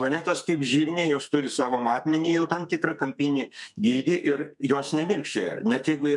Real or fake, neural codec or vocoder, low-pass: fake; codec, 32 kHz, 1.9 kbps, SNAC; 10.8 kHz